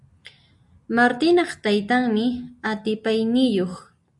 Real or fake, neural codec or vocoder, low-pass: real; none; 10.8 kHz